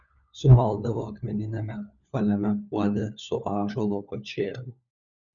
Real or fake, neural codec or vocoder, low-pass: fake; codec, 16 kHz, 4 kbps, FunCodec, trained on LibriTTS, 50 frames a second; 7.2 kHz